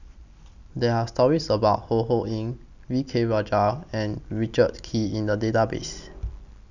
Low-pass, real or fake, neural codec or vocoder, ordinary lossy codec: 7.2 kHz; real; none; none